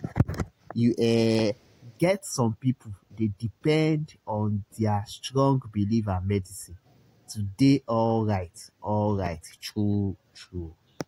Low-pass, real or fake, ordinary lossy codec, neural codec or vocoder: 19.8 kHz; real; AAC, 48 kbps; none